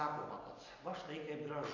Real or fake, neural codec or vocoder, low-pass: real; none; 7.2 kHz